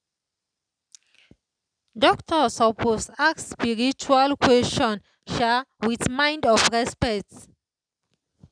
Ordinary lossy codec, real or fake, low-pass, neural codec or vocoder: none; real; 9.9 kHz; none